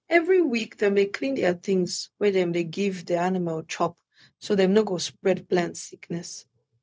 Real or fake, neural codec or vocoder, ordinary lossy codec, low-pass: fake; codec, 16 kHz, 0.4 kbps, LongCat-Audio-Codec; none; none